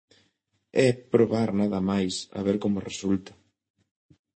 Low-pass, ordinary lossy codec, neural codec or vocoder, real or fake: 9.9 kHz; MP3, 32 kbps; none; real